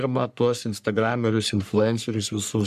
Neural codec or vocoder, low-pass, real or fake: codec, 44.1 kHz, 3.4 kbps, Pupu-Codec; 14.4 kHz; fake